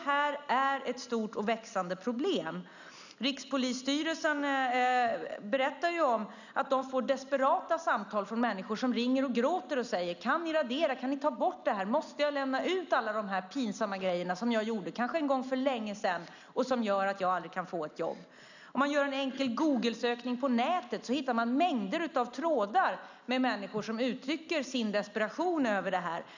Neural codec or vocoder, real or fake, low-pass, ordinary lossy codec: none; real; 7.2 kHz; none